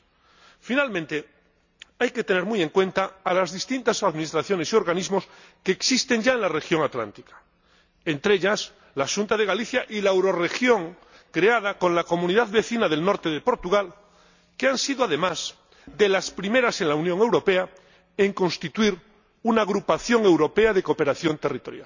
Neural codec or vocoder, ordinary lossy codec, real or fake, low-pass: none; none; real; 7.2 kHz